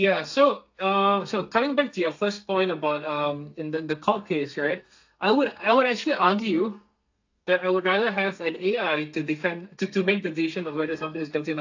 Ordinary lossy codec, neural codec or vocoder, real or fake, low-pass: AAC, 48 kbps; codec, 32 kHz, 1.9 kbps, SNAC; fake; 7.2 kHz